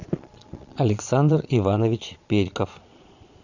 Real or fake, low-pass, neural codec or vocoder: fake; 7.2 kHz; vocoder, 22.05 kHz, 80 mel bands, Vocos